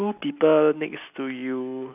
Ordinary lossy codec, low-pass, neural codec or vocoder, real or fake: none; 3.6 kHz; none; real